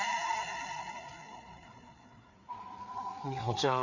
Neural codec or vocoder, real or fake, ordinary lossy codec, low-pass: codec, 16 kHz, 4 kbps, FreqCodec, larger model; fake; none; 7.2 kHz